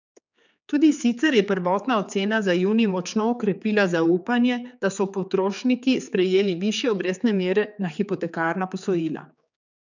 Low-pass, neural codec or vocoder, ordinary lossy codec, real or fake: 7.2 kHz; codec, 16 kHz, 4 kbps, X-Codec, HuBERT features, trained on general audio; none; fake